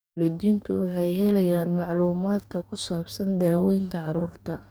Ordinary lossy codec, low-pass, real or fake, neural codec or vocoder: none; none; fake; codec, 44.1 kHz, 2.6 kbps, DAC